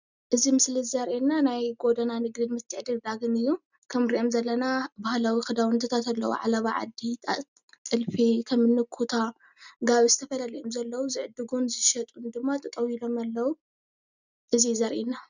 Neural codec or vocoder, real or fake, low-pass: none; real; 7.2 kHz